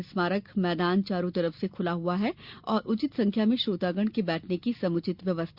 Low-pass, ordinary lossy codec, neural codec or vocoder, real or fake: 5.4 kHz; Opus, 64 kbps; none; real